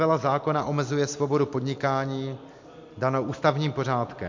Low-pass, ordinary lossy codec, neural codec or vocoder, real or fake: 7.2 kHz; MP3, 48 kbps; none; real